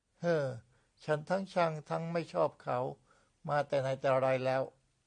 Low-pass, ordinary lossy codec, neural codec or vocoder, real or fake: 9.9 kHz; MP3, 96 kbps; none; real